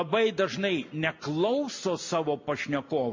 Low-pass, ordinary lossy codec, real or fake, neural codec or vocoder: 7.2 kHz; MP3, 48 kbps; real; none